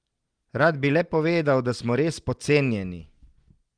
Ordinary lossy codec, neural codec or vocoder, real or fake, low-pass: Opus, 24 kbps; none; real; 9.9 kHz